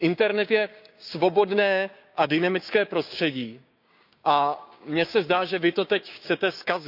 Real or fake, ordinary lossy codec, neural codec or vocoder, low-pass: fake; none; codec, 16 kHz, 6 kbps, DAC; 5.4 kHz